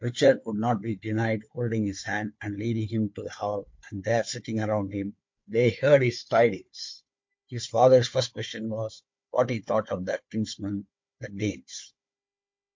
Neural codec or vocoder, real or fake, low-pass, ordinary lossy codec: codec, 16 kHz, 4 kbps, FunCodec, trained on Chinese and English, 50 frames a second; fake; 7.2 kHz; MP3, 48 kbps